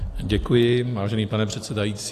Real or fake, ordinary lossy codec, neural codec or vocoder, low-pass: real; AAC, 64 kbps; none; 14.4 kHz